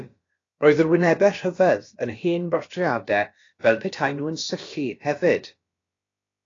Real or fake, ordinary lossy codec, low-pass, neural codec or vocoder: fake; AAC, 32 kbps; 7.2 kHz; codec, 16 kHz, about 1 kbps, DyCAST, with the encoder's durations